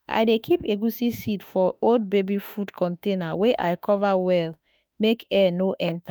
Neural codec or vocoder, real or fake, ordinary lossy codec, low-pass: autoencoder, 48 kHz, 32 numbers a frame, DAC-VAE, trained on Japanese speech; fake; none; none